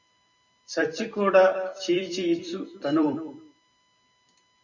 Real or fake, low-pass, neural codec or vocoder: fake; 7.2 kHz; vocoder, 44.1 kHz, 128 mel bands every 256 samples, BigVGAN v2